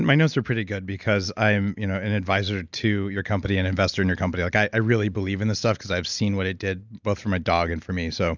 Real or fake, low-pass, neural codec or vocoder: real; 7.2 kHz; none